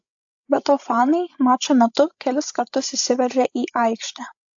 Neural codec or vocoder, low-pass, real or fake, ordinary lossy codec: codec, 16 kHz, 16 kbps, FreqCodec, larger model; 7.2 kHz; fake; AAC, 64 kbps